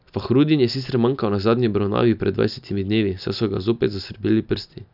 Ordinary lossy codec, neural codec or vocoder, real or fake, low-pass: none; none; real; 5.4 kHz